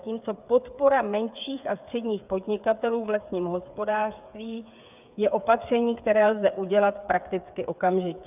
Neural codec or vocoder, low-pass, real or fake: codec, 16 kHz, 8 kbps, FreqCodec, smaller model; 3.6 kHz; fake